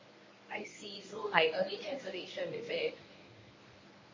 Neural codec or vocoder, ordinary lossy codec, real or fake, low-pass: codec, 24 kHz, 0.9 kbps, WavTokenizer, medium speech release version 1; MP3, 32 kbps; fake; 7.2 kHz